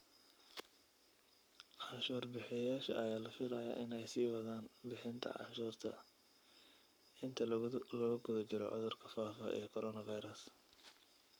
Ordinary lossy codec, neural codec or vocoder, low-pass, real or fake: none; codec, 44.1 kHz, 7.8 kbps, Pupu-Codec; none; fake